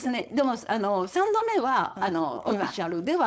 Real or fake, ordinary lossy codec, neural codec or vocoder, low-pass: fake; none; codec, 16 kHz, 4.8 kbps, FACodec; none